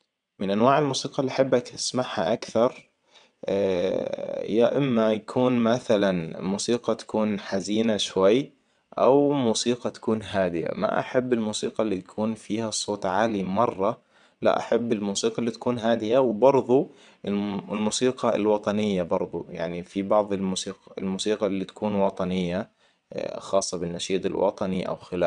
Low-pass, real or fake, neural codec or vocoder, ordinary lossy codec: 9.9 kHz; fake; vocoder, 22.05 kHz, 80 mel bands, WaveNeXt; none